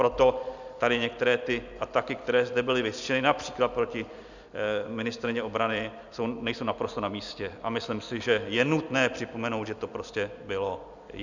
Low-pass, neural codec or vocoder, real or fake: 7.2 kHz; none; real